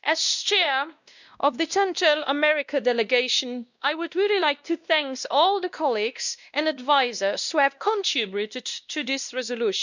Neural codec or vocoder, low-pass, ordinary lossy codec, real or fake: codec, 16 kHz, 1 kbps, X-Codec, WavLM features, trained on Multilingual LibriSpeech; 7.2 kHz; none; fake